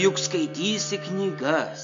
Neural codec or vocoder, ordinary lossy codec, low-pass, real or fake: none; MP3, 48 kbps; 7.2 kHz; real